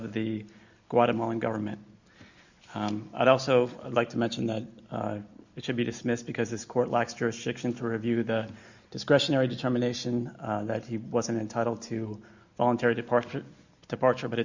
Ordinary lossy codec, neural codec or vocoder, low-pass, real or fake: Opus, 64 kbps; none; 7.2 kHz; real